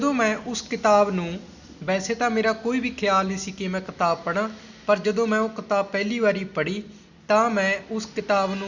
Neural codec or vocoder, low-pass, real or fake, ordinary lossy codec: none; 7.2 kHz; real; Opus, 64 kbps